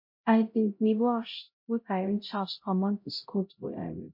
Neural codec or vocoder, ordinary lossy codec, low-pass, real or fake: codec, 16 kHz, 0.5 kbps, X-Codec, HuBERT features, trained on LibriSpeech; MP3, 32 kbps; 5.4 kHz; fake